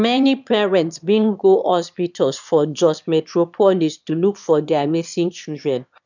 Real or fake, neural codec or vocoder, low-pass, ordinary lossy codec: fake; autoencoder, 22.05 kHz, a latent of 192 numbers a frame, VITS, trained on one speaker; 7.2 kHz; none